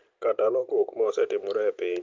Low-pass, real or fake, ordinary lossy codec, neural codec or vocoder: 7.2 kHz; real; Opus, 32 kbps; none